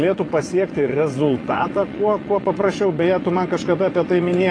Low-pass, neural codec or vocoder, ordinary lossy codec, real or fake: 9.9 kHz; vocoder, 22.05 kHz, 80 mel bands, WaveNeXt; AAC, 32 kbps; fake